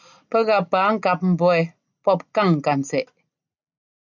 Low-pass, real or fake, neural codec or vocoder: 7.2 kHz; real; none